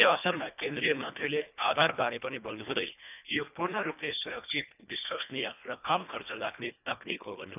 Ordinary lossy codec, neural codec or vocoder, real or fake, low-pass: none; codec, 24 kHz, 1.5 kbps, HILCodec; fake; 3.6 kHz